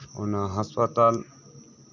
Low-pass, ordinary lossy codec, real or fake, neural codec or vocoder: 7.2 kHz; none; real; none